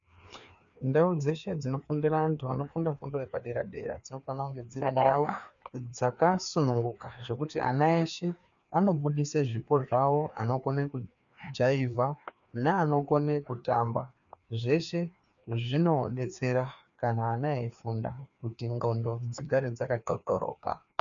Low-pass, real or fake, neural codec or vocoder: 7.2 kHz; fake; codec, 16 kHz, 2 kbps, FreqCodec, larger model